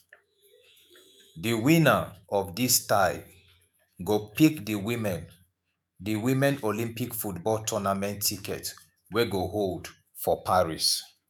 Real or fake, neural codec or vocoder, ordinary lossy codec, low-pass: fake; autoencoder, 48 kHz, 128 numbers a frame, DAC-VAE, trained on Japanese speech; none; none